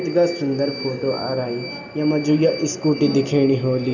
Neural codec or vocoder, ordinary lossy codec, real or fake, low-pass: none; none; real; 7.2 kHz